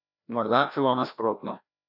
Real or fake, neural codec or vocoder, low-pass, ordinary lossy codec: fake; codec, 16 kHz, 1 kbps, FreqCodec, larger model; 5.4 kHz; none